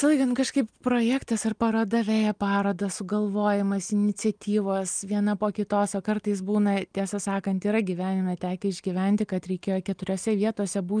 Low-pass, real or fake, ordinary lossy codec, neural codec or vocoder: 9.9 kHz; real; Opus, 32 kbps; none